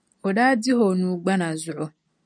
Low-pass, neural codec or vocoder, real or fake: 9.9 kHz; none; real